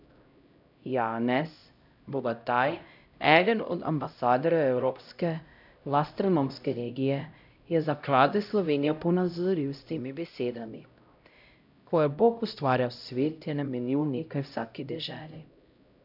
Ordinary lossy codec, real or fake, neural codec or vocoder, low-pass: none; fake; codec, 16 kHz, 0.5 kbps, X-Codec, HuBERT features, trained on LibriSpeech; 5.4 kHz